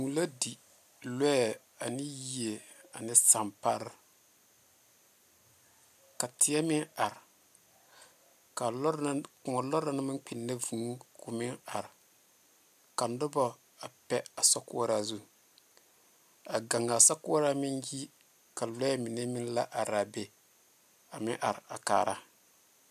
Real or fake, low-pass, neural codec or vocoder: real; 14.4 kHz; none